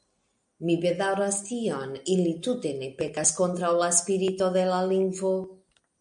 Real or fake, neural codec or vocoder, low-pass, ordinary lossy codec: real; none; 9.9 kHz; MP3, 96 kbps